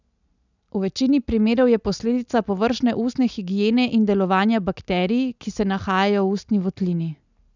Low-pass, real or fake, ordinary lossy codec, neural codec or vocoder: 7.2 kHz; real; none; none